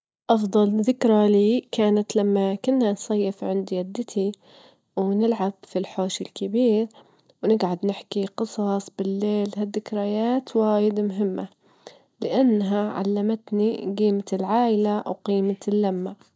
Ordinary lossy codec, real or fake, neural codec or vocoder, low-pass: none; real; none; none